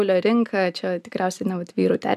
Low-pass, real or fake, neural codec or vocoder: 14.4 kHz; real; none